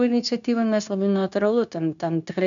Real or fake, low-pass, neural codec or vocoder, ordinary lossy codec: fake; 7.2 kHz; codec, 16 kHz, 0.9 kbps, LongCat-Audio-Codec; MP3, 96 kbps